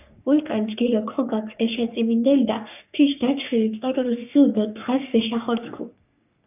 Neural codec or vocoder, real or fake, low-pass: codec, 44.1 kHz, 3.4 kbps, Pupu-Codec; fake; 3.6 kHz